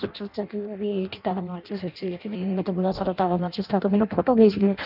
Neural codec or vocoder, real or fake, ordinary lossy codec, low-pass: codec, 16 kHz in and 24 kHz out, 0.6 kbps, FireRedTTS-2 codec; fake; none; 5.4 kHz